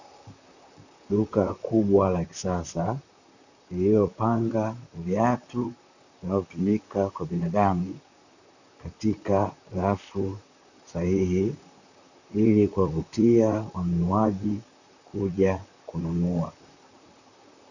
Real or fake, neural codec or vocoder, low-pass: fake; vocoder, 44.1 kHz, 128 mel bands, Pupu-Vocoder; 7.2 kHz